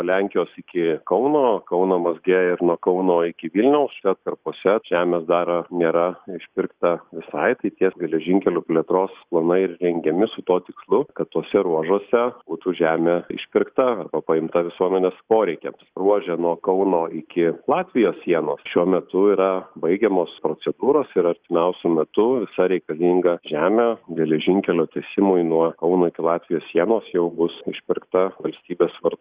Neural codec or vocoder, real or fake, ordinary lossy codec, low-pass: none; real; Opus, 64 kbps; 3.6 kHz